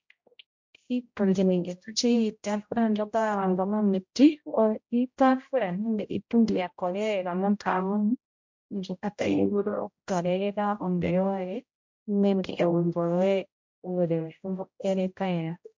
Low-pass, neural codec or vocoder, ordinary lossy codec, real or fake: 7.2 kHz; codec, 16 kHz, 0.5 kbps, X-Codec, HuBERT features, trained on general audio; MP3, 48 kbps; fake